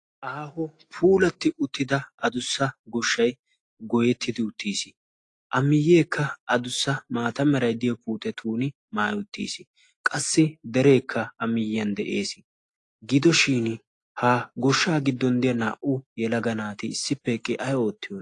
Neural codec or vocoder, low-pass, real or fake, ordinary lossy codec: none; 10.8 kHz; real; AAC, 48 kbps